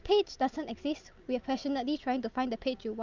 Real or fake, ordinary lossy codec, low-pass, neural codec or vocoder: real; Opus, 24 kbps; 7.2 kHz; none